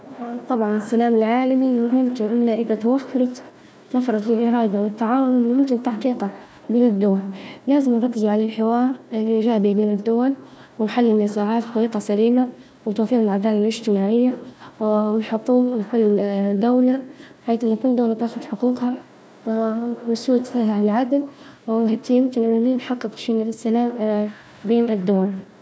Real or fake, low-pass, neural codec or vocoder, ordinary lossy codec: fake; none; codec, 16 kHz, 1 kbps, FunCodec, trained on Chinese and English, 50 frames a second; none